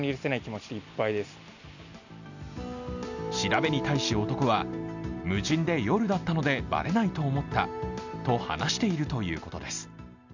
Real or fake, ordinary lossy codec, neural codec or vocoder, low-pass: real; none; none; 7.2 kHz